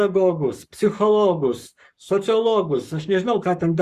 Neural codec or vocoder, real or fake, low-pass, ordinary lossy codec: codec, 44.1 kHz, 7.8 kbps, Pupu-Codec; fake; 14.4 kHz; Opus, 64 kbps